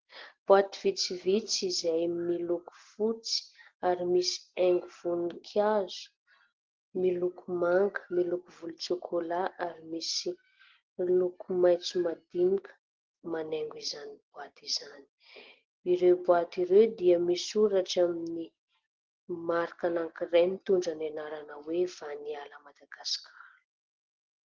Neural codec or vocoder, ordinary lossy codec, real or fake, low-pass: none; Opus, 16 kbps; real; 7.2 kHz